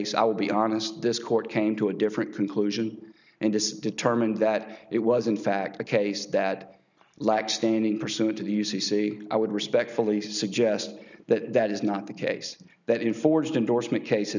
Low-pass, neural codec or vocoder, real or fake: 7.2 kHz; none; real